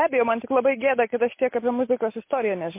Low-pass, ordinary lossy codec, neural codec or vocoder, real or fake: 3.6 kHz; MP3, 24 kbps; none; real